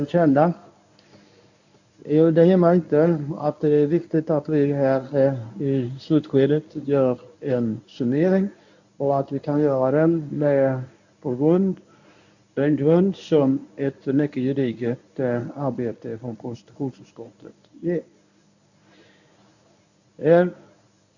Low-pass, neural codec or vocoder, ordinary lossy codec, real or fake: 7.2 kHz; codec, 24 kHz, 0.9 kbps, WavTokenizer, medium speech release version 1; none; fake